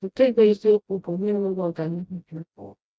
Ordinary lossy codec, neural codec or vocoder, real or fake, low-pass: none; codec, 16 kHz, 0.5 kbps, FreqCodec, smaller model; fake; none